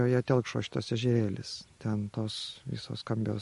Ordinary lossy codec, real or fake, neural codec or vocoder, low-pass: MP3, 48 kbps; real; none; 14.4 kHz